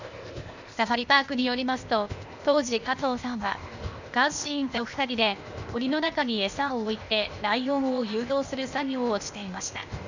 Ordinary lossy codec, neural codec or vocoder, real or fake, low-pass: none; codec, 16 kHz, 0.8 kbps, ZipCodec; fake; 7.2 kHz